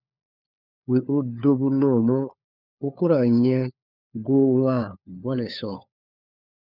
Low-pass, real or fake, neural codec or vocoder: 5.4 kHz; fake; codec, 16 kHz, 4 kbps, FunCodec, trained on LibriTTS, 50 frames a second